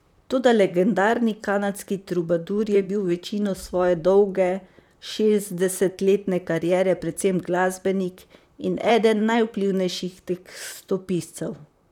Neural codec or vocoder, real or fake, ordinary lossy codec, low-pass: vocoder, 44.1 kHz, 128 mel bands, Pupu-Vocoder; fake; none; 19.8 kHz